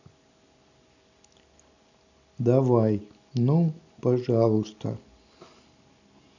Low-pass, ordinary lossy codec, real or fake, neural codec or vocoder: 7.2 kHz; none; real; none